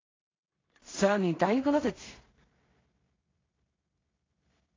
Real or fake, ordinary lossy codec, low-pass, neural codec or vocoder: fake; AAC, 32 kbps; 7.2 kHz; codec, 16 kHz in and 24 kHz out, 0.4 kbps, LongCat-Audio-Codec, two codebook decoder